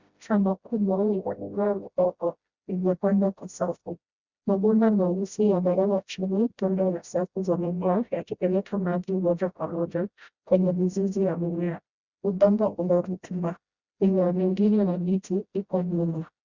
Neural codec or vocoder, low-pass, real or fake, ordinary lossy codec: codec, 16 kHz, 0.5 kbps, FreqCodec, smaller model; 7.2 kHz; fake; Opus, 64 kbps